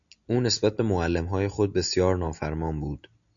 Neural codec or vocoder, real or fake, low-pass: none; real; 7.2 kHz